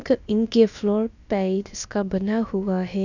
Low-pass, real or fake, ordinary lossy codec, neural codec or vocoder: 7.2 kHz; fake; none; codec, 16 kHz, about 1 kbps, DyCAST, with the encoder's durations